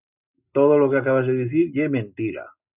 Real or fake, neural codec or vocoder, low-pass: real; none; 3.6 kHz